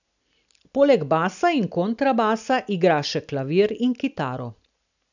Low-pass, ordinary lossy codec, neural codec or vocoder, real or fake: 7.2 kHz; none; none; real